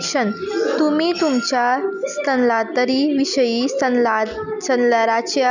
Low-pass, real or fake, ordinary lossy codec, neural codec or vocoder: 7.2 kHz; real; none; none